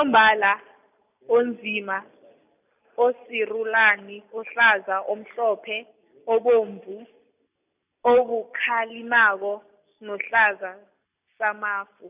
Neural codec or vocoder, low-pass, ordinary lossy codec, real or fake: none; 3.6 kHz; none; real